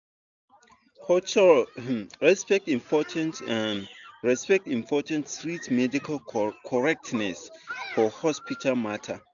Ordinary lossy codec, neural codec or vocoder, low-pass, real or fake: none; none; 7.2 kHz; real